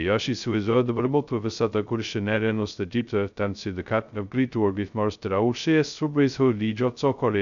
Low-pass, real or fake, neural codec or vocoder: 7.2 kHz; fake; codec, 16 kHz, 0.2 kbps, FocalCodec